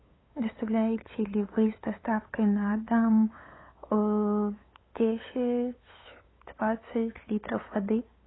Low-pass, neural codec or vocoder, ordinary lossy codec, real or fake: 7.2 kHz; codec, 16 kHz, 8 kbps, FunCodec, trained on LibriTTS, 25 frames a second; AAC, 16 kbps; fake